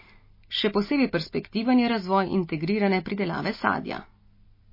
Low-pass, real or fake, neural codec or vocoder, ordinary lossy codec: 5.4 kHz; real; none; MP3, 24 kbps